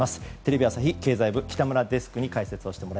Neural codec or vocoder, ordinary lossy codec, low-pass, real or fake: none; none; none; real